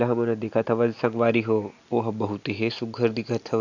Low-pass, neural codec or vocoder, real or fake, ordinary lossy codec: 7.2 kHz; none; real; none